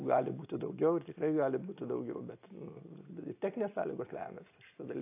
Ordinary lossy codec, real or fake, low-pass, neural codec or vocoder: AAC, 24 kbps; real; 3.6 kHz; none